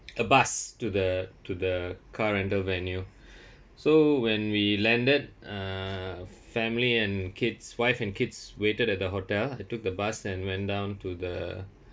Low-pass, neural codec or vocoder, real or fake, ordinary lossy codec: none; none; real; none